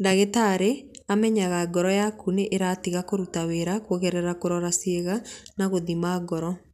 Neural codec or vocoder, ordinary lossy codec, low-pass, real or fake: none; none; 14.4 kHz; real